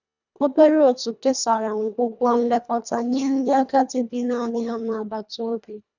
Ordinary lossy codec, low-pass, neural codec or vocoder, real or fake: none; 7.2 kHz; codec, 24 kHz, 1.5 kbps, HILCodec; fake